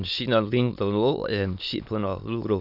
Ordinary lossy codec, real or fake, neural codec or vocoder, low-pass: none; fake; autoencoder, 22.05 kHz, a latent of 192 numbers a frame, VITS, trained on many speakers; 5.4 kHz